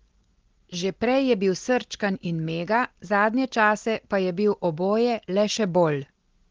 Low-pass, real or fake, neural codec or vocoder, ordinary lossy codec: 7.2 kHz; real; none; Opus, 16 kbps